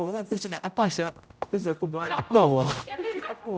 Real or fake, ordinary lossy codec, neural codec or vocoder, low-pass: fake; none; codec, 16 kHz, 0.5 kbps, X-Codec, HuBERT features, trained on general audio; none